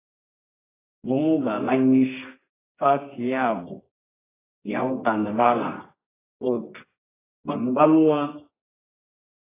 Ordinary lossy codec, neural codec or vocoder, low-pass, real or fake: AAC, 16 kbps; codec, 24 kHz, 0.9 kbps, WavTokenizer, medium music audio release; 3.6 kHz; fake